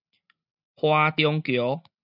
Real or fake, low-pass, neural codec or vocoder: real; 5.4 kHz; none